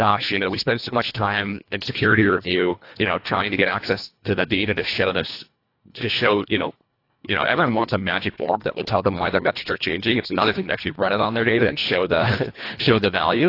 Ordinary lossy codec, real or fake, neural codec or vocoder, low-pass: AAC, 32 kbps; fake; codec, 24 kHz, 1.5 kbps, HILCodec; 5.4 kHz